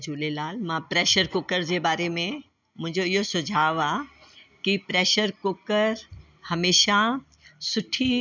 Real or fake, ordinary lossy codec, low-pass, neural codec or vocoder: real; none; 7.2 kHz; none